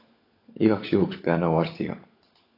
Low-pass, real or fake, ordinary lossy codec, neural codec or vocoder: 5.4 kHz; fake; AAC, 32 kbps; codec, 16 kHz, 16 kbps, FunCodec, trained on LibriTTS, 50 frames a second